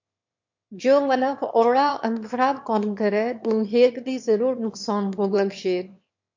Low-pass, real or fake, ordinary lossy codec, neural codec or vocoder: 7.2 kHz; fake; MP3, 48 kbps; autoencoder, 22.05 kHz, a latent of 192 numbers a frame, VITS, trained on one speaker